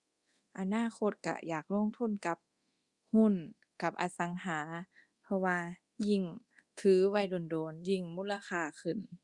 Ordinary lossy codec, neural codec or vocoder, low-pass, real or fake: Opus, 64 kbps; codec, 24 kHz, 0.9 kbps, DualCodec; 10.8 kHz; fake